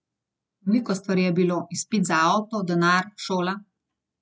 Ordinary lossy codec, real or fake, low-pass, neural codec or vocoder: none; real; none; none